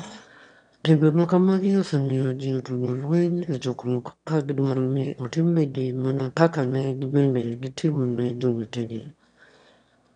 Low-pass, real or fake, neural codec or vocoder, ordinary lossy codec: 9.9 kHz; fake; autoencoder, 22.05 kHz, a latent of 192 numbers a frame, VITS, trained on one speaker; none